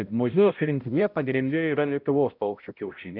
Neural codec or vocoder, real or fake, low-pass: codec, 16 kHz, 0.5 kbps, X-Codec, HuBERT features, trained on balanced general audio; fake; 5.4 kHz